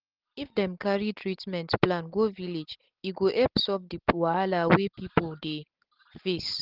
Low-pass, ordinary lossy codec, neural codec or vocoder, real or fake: 5.4 kHz; Opus, 16 kbps; none; real